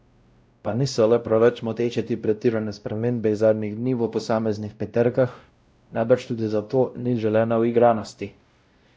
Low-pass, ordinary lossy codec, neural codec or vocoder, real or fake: none; none; codec, 16 kHz, 0.5 kbps, X-Codec, WavLM features, trained on Multilingual LibriSpeech; fake